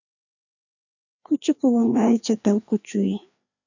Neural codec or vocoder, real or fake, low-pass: codec, 16 kHz, 2 kbps, FreqCodec, larger model; fake; 7.2 kHz